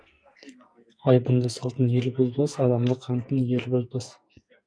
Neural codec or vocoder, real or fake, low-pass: codec, 44.1 kHz, 2.6 kbps, SNAC; fake; 9.9 kHz